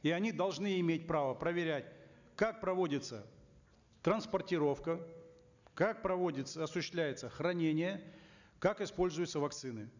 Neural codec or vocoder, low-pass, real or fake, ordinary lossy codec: none; 7.2 kHz; real; none